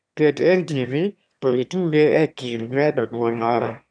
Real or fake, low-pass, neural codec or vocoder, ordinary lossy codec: fake; 9.9 kHz; autoencoder, 22.05 kHz, a latent of 192 numbers a frame, VITS, trained on one speaker; none